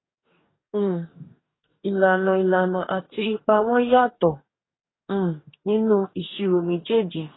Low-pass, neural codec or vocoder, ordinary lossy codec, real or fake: 7.2 kHz; codec, 44.1 kHz, 2.6 kbps, DAC; AAC, 16 kbps; fake